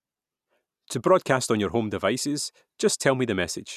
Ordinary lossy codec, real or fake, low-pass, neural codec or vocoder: none; real; 14.4 kHz; none